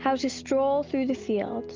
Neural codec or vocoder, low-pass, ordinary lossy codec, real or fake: none; 7.2 kHz; Opus, 32 kbps; real